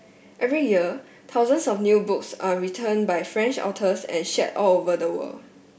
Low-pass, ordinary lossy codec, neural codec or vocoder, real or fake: none; none; none; real